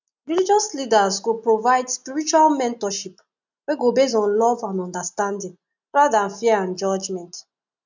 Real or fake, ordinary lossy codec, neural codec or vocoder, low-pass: real; none; none; 7.2 kHz